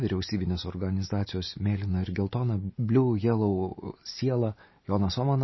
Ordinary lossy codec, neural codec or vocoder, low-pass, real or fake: MP3, 24 kbps; none; 7.2 kHz; real